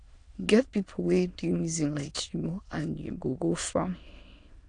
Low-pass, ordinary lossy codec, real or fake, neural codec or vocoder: 9.9 kHz; AAC, 48 kbps; fake; autoencoder, 22.05 kHz, a latent of 192 numbers a frame, VITS, trained on many speakers